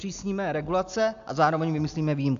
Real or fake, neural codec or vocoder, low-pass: real; none; 7.2 kHz